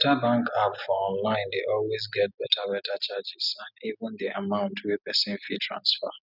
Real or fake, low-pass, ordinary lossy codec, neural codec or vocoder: real; 5.4 kHz; none; none